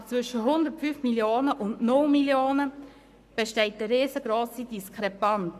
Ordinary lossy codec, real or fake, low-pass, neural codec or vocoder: none; fake; 14.4 kHz; codec, 44.1 kHz, 7.8 kbps, Pupu-Codec